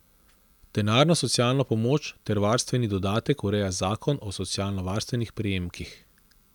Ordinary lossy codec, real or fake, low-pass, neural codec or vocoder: none; real; 19.8 kHz; none